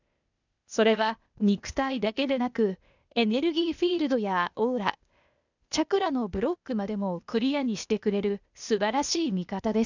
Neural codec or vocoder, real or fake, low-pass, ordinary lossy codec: codec, 16 kHz, 0.8 kbps, ZipCodec; fake; 7.2 kHz; none